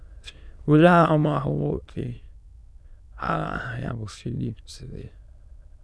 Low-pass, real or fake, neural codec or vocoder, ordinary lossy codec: none; fake; autoencoder, 22.05 kHz, a latent of 192 numbers a frame, VITS, trained on many speakers; none